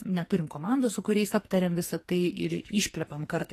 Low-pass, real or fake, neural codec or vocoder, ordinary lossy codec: 14.4 kHz; fake; codec, 44.1 kHz, 2.6 kbps, SNAC; AAC, 48 kbps